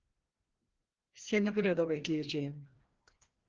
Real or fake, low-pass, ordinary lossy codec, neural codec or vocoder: fake; 7.2 kHz; Opus, 16 kbps; codec, 16 kHz, 1 kbps, FreqCodec, larger model